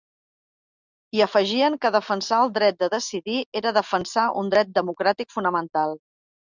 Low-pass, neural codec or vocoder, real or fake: 7.2 kHz; none; real